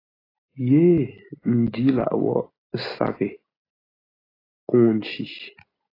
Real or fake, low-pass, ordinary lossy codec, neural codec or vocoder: real; 5.4 kHz; AAC, 24 kbps; none